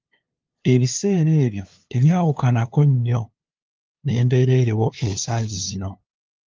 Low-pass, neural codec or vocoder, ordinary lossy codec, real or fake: 7.2 kHz; codec, 16 kHz, 2 kbps, FunCodec, trained on LibriTTS, 25 frames a second; Opus, 32 kbps; fake